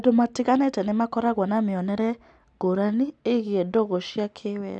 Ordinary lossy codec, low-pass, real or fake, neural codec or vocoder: none; none; real; none